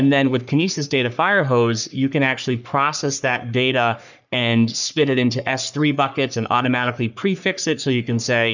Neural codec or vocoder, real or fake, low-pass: codec, 44.1 kHz, 3.4 kbps, Pupu-Codec; fake; 7.2 kHz